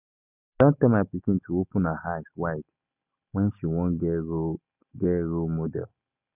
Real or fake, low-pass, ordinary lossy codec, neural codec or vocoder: real; 3.6 kHz; none; none